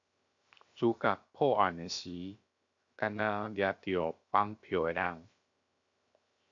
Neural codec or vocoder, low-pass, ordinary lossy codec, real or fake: codec, 16 kHz, 0.7 kbps, FocalCodec; 7.2 kHz; AAC, 64 kbps; fake